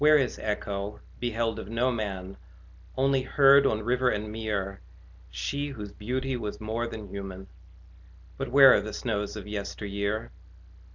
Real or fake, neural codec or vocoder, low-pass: real; none; 7.2 kHz